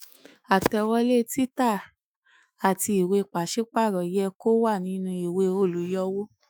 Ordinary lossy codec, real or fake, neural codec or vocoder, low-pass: none; fake; autoencoder, 48 kHz, 128 numbers a frame, DAC-VAE, trained on Japanese speech; none